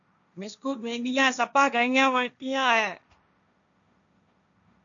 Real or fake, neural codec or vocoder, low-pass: fake; codec, 16 kHz, 1.1 kbps, Voila-Tokenizer; 7.2 kHz